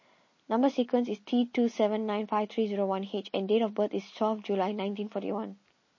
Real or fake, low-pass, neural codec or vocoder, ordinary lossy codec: real; 7.2 kHz; none; MP3, 32 kbps